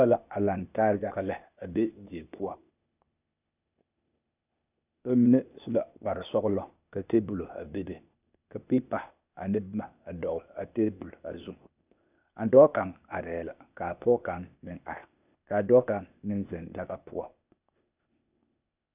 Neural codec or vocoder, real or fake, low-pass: codec, 16 kHz, 0.8 kbps, ZipCodec; fake; 3.6 kHz